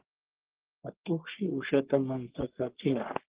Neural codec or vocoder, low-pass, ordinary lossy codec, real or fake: codec, 44.1 kHz, 3.4 kbps, Pupu-Codec; 3.6 kHz; Opus, 16 kbps; fake